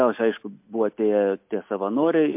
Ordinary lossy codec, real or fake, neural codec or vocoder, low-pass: MP3, 32 kbps; real; none; 3.6 kHz